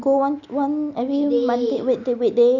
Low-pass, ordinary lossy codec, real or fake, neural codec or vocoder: 7.2 kHz; none; real; none